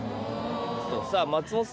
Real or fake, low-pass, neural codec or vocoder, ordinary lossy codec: real; none; none; none